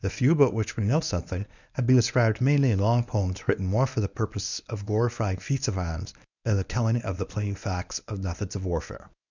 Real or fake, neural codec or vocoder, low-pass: fake; codec, 24 kHz, 0.9 kbps, WavTokenizer, medium speech release version 1; 7.2 kHz